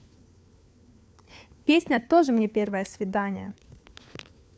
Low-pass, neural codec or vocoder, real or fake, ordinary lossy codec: none; codec, 16 kHz, 4 kbps, FunCodec, trained on LibriTTS, 50 frames a second; fake; none